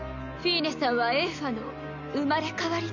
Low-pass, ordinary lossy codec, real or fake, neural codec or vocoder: 7.2 kHz; none; real; none